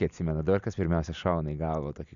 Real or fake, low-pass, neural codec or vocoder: real; 7.2 kHz; none